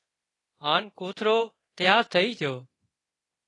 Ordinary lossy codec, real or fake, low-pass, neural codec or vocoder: AAC, 32 kbps; fake; 10.8 kHz; codec, 24 kHz, 0.9 kbps, DualCodec